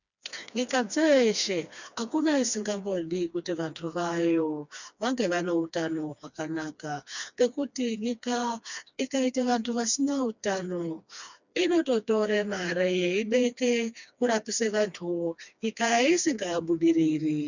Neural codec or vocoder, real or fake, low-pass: codec, 16 kHz, 2 kbps, FreqCodec, smaller model; fake; 7.2 kHz